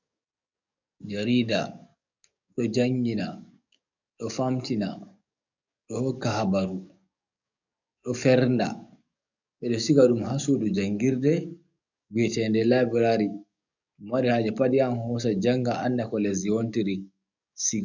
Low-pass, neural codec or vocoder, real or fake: 7.2 kHz; codec, 44.1 kHz, 7.8 kbps, DAC; fake